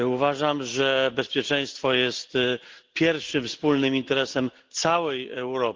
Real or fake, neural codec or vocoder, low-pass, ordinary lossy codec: real; none; 7.2 kHz; Opus, 16 kbps